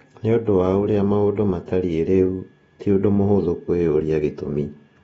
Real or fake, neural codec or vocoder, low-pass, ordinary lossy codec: fake; vocoder, 48 kHz, 128 mel bands, Vocos; 19.8 kHz; AAC, 24 kbps